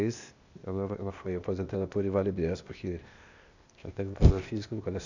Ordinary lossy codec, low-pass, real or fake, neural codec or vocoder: none; 7.2 kHz; fake; codec, 16 kHz, 0.8 kbps, ZipCodec